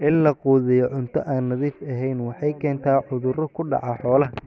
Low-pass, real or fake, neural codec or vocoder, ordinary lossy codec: none; real; none; none